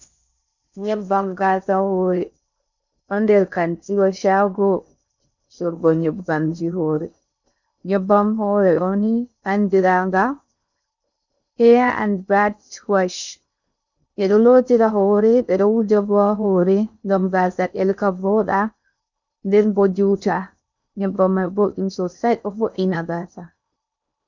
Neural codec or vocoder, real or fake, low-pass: codec, 16 kHz in and 24 kHz out, 0.6 kbps, FocalCodec, streaming, 4096 codes; fake; 7.2 kHz